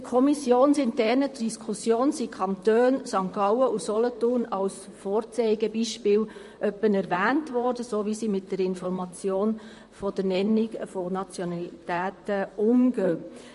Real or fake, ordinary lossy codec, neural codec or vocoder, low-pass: fake; MP3, 48 kbps; vocoder, 44.1 kHz, 128 mel bands, Pupu-Vocoder; 14.4 kHz